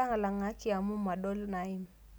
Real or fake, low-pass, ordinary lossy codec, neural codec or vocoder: real; none; none; none